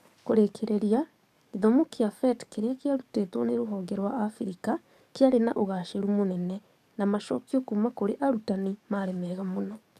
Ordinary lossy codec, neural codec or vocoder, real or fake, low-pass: none; codec, 44.1 kHz, 7.8 kbps, DAC; fake; 14.4 kHz